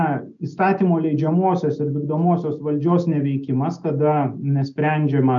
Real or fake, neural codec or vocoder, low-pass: real; none; 7.2 kHz